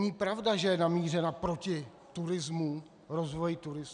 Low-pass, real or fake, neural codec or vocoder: 9.9 kHz; real; none